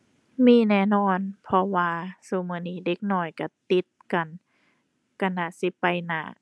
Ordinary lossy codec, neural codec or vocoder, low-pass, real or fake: none; none; none; real